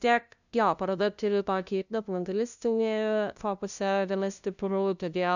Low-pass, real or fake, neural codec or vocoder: 7.2 kHz; fake; codec, 16 kHz, 0.5 kbps, FunCodec, trained on LibriTTS, 25 frames a second